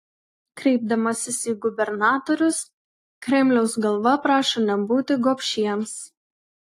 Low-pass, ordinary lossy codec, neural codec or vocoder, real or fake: 14.4 kHz; AAC, 48 kbps; none; real